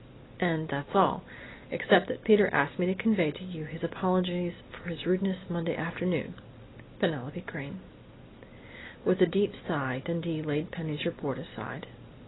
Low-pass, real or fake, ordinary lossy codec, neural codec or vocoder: 7.2 kHz; real; AAC, 16 kbps; none